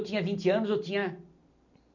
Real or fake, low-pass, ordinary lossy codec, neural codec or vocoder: real; 7.2 kHz; none; none